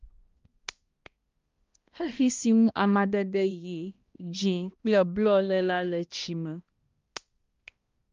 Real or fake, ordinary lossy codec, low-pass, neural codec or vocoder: fake; Opus, 32 kbps; 7.2 kHz; codec, 16 kHz, 1 kbps, X-Codec, HuBERT features, trained on balanced general audio